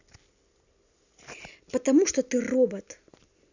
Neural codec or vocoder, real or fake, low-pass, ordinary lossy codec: none; real; 7.2 kHz; none